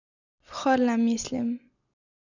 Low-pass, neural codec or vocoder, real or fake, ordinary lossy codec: 7.2 kHz; none; real; none